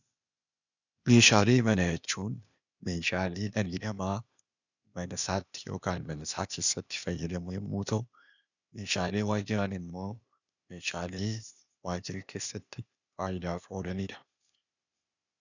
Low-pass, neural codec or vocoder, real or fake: 7.2 kHz; codec, 16 kHz, 0.8 kbps, ZipCodec; fake